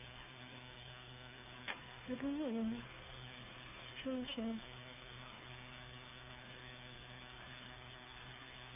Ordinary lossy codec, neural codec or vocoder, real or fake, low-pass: none; codec, 16 kHz, 2 kbps, FunCodec, trained on Chinese and English, 25 frames a second; fake; 3.6 kHz